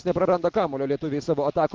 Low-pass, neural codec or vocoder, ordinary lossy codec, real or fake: 7.2 kHz; none; Opus, 16 kbps; real